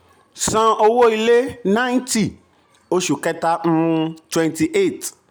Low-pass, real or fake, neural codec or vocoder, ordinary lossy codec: none; real; none; none